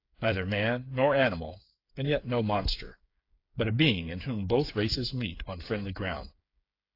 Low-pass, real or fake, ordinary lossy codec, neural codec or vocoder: 5.4 kHz; fake; AAC, 32 kbps; codec, 16 kHz, 8 kbps, FreqCodec, smaller model